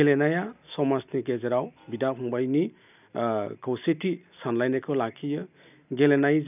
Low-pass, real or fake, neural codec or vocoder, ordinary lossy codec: 3.6 kHz; real; none; none